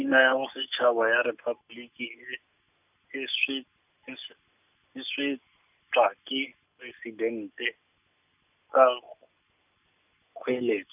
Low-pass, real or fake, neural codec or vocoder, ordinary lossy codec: 3.6 kHz; real; none; none